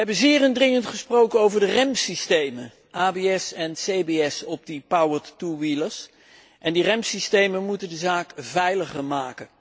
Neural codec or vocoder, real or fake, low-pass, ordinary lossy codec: none; real; none; none